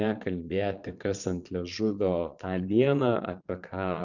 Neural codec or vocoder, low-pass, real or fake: vocoder, 22.05 kHz, 80 mel bands, Vocos; 7.2 kHz; fake